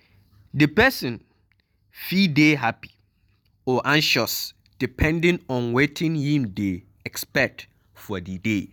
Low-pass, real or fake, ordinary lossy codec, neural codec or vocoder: none; real; none; none